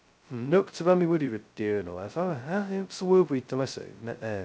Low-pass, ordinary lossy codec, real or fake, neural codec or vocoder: none; none; fake; codec, 16 kHz, 0.2 kbps, FocalCodec